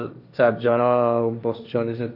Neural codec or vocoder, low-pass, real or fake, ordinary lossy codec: codec, 16 kHz, 1.1 kbps, Voila-Tokenizer; 5.4 kHz; fake; none